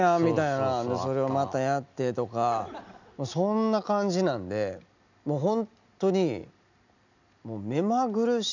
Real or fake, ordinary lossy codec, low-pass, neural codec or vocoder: real; none; 7.2 kHz; none